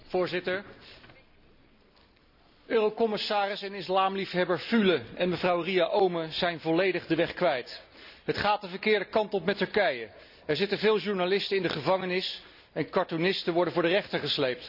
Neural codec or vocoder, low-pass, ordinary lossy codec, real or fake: none; 5.4 kHz; none; real